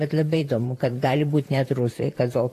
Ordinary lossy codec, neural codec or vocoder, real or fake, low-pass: AAC, 48 kbps; vocoder, 44.1 kHz, 128 mel bands, Pupu-Vocoder; fake; 14.4 kHz